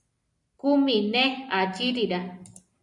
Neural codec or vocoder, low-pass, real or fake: none; 10.8 kHz; real